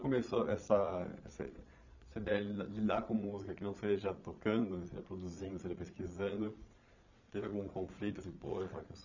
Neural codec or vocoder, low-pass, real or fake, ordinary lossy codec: vocoder, 22.05 kHz, 80 mel bands, Vocos; 7.2 kHz; fake; none